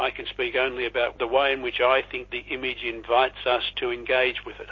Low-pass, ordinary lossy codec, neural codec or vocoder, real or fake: 7.2 kHz; MP3, 32 kbps; none; real